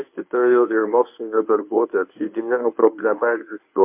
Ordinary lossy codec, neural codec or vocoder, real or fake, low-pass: MP3, 32 kbps; codec, 24 kHz, 0.9 kbps, WavTokenizer, medium speech release version 1; fake; 3.6 kHz